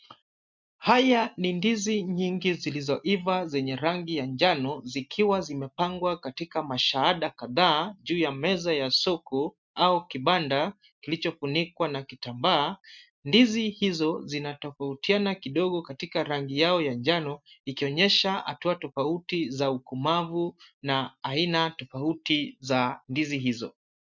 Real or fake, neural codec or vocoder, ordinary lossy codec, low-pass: real; none; MP3, 64 kbps; 7.2 kHz